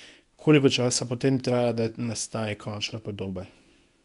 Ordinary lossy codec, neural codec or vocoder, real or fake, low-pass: none; codec, 24 kHz, 0.9 kbps, WavTokenizer, medium speech release version 1; fake; 10.8 kHz